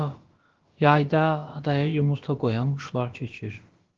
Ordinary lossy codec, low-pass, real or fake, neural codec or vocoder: Opus, 16 kbps; 7.2 kHz; fake; codec, 16 kHz, about 1 kbps, DyCAST, with the encoder's durations